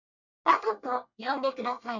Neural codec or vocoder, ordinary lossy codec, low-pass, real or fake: codec, 24 kHz, 1 kbps, SNAC; none; 7.2 kHz; fake